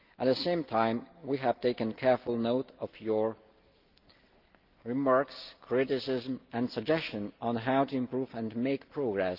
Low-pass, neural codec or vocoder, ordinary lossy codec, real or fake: 5.4 kHz; none; Opus, 32 kbps; real